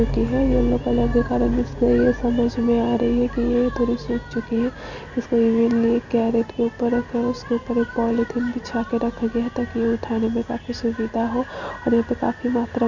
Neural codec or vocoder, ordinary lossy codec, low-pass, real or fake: none; none; 7.2 kHz; real